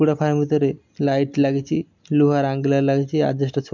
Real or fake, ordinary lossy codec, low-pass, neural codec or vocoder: real; MP3, 64 kbps; 7.2 kHz; none